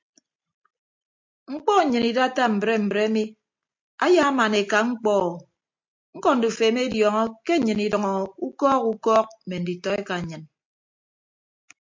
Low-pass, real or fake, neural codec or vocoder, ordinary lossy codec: 7.2 kHz; real; none; MP3, 48 kbps